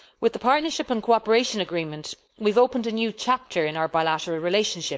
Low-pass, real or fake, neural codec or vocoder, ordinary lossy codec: none; fake; codec, 16 kHz, 4.8 kbps, FACodec; none